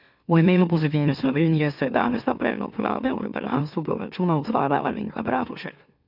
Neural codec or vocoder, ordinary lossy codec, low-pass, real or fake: autoencoder, 44.1 kHz, a latent of 192 numbers a frame, MeloTTS; none; 5.4 kHz; fake